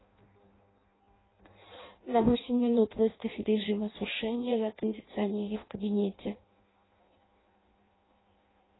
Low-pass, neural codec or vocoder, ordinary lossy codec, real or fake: 7.2 kHz; codec, 16 kHz in and 24 kHz out, 0.6 kbps, FireRedTTS-2 codec; AAC, 16 kbps; fake